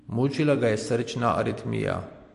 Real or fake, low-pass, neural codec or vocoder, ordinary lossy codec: fake; 14.4 kHz; vocoder, 44.1 kHz, 128 mel bands every 256 samples, BigVGAN v2; MP3, 48 kbps